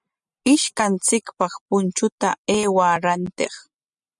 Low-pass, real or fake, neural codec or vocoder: 10.8 kHz; real; none